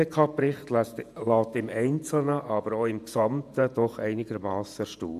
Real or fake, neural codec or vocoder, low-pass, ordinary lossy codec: fake; vocoder, 48 kHz, 128 mel bands, Vocos; 14.4 kHz; AAC, 96 kbps